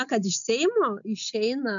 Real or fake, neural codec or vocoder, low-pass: real; none; 7.2 kHz